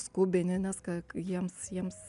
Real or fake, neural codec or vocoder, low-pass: real; none; 10.8 kHz